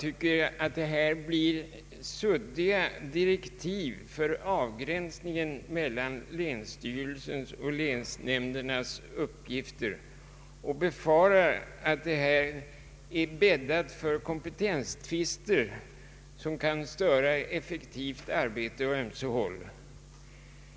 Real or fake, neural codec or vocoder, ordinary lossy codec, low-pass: real; none; none; none